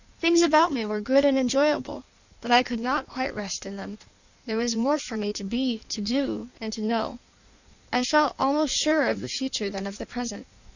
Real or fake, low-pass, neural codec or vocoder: fake; 7.2 kHz; codec, 16 kHz in and 24 kHz out, 1.1 kbps, FireRedTTS-2 codec